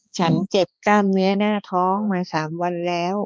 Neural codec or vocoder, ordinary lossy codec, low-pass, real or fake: codec, 16 kHz, 2 kbps, X-Codec, HuBERT features, trained on balanced general audio; none; none; fake